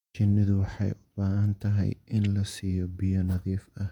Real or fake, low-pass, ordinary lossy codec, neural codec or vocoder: fake; 19.8 kHz; none; vocoder, 48 kHz, 128 mel bands, Vocos